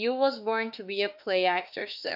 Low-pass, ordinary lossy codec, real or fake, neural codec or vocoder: 5.4 kHz; AAC, 48 kbps; fake; autoencoder, 48 kHz, 32 numbers a frame, DAC-VAE, trained on Japanese speech